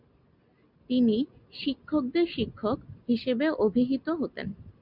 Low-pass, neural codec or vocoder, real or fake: 5.4 kHz; none; real